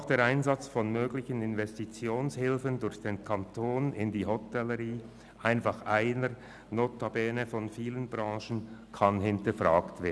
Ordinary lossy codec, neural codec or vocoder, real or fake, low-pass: none; none; real; none